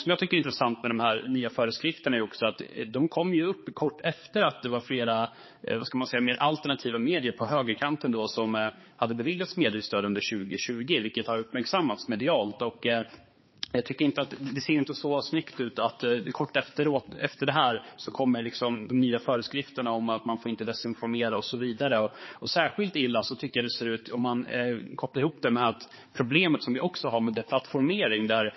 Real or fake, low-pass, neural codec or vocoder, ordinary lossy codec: fake; 7.2 kHz; codec, 16 kHz, 4 kbps, X-Codec, HuBERT features, trained on balanced general audio; MP3, 24 kbps